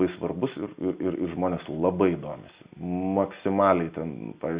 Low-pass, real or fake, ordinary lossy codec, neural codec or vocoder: 3.6 kHz; real; Opus, 64 kbps; none